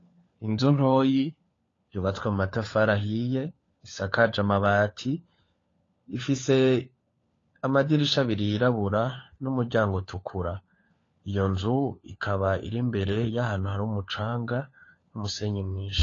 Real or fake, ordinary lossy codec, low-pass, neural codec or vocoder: fake; AAC, 32 kbps; 7.2 kHz; codec, 16 kHz, 4 kbps, FunCodec, trained on LibriTTS, 50 frames a second